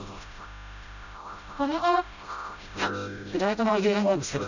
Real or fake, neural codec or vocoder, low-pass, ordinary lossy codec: fake; codec, 16 kHz, 0.5 kbps, FreqCodec, smaller model; 7.2 kHz; none